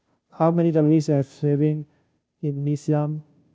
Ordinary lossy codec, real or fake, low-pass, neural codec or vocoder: none; fake; none; codec, 16 kHz, 0.5 kbps, FunCodec, trained on Chinese and English, 25 frames a second